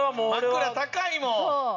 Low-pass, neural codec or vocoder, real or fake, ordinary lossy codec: 7.2 kHz; none; real; none